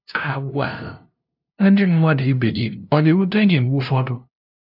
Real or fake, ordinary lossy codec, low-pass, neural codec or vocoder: fake; none; 5.4 kHz; codec, 16 kHz, 0.5 kbps, FunCodec, trained on LibriTTS, 25 frames a second